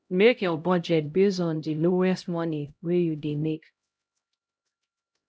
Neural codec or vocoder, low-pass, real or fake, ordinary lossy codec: codec, 16 kHz, 0.5 kbps, X-Codec, HuBERT features, trained on LibriSpeech; none; fake; none